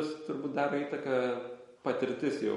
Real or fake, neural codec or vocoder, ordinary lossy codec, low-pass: real; none; MP3, 48 kbps; 19.8 kHz